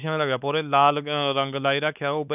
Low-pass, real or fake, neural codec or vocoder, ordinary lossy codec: 3.6 kHz; fake; codec, 16 kHz, 4 kbps, X-Codec, WavLM features, trained on Multilingual LibriSpeech; none